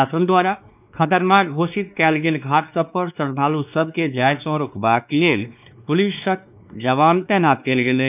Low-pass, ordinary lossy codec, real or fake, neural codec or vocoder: 3.6 kHz; none; fake; codec, 16 kHz, 2 kbps, X-Codec, WavLM features, trained on Multilingual LibriSpeech